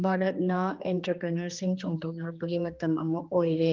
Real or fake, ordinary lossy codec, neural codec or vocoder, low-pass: fake; Opus, 32 kbps; codec, 16 kHz, 2 kbps, X-Codec, HuBERT features, trained on general audio; 7.2 kHz